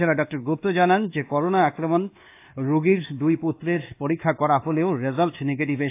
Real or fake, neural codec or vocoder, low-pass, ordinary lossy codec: fake; codec, 24 kHz, 1.2 kbps, DualCodec; 3.6 kHz; AAC, 24 kbps